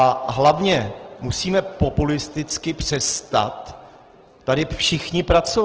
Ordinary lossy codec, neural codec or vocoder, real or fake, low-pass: Opus, 16 kbps; none; real; 7.2 kHz